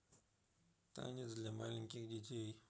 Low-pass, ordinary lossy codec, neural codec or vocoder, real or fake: none; none; none; real